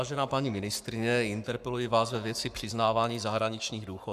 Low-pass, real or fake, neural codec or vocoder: 14.4 kHz; fake; codec, 44.1 kHz, 7.8 kbps, Pupu-Codec